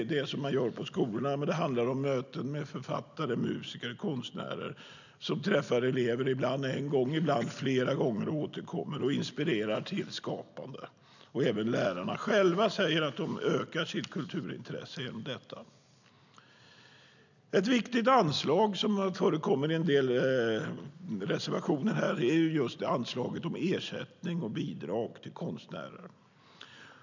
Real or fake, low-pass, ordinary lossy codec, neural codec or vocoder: real; 7.2 kHz; none; none